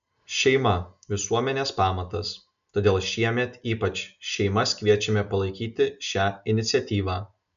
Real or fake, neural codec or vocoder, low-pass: real; none; 7.2 kHz